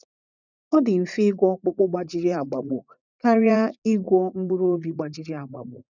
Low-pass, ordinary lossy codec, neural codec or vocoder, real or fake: 7.2 kHz; none; vocoder, 44.1 kHz, 80 mel bands, Vocos; fake